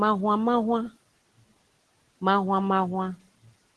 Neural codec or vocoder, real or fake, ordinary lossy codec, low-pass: none; real; Opus, 16 kbps; 10.8 kHz